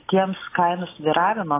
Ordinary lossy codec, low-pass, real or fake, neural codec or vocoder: AAC, 16 kbps; 3.6 kHz; real; none